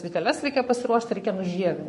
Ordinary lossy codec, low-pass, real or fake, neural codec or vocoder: MP3, 48 kbps; 14.4 kHz; fake; codec, 44.1 kHz, 7.8 kbps, Pupu-Codec